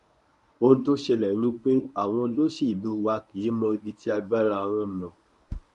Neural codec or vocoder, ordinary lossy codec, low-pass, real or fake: codec, 24 kHz, 0.9 kbps, WavTokenizer, medium speech release version 1; none; 10.8 kHz; fake